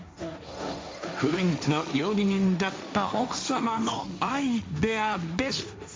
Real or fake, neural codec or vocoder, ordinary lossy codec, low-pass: fake; codec, 16 kHz, 1.1 kbps, Voila-Tokenizer; none; none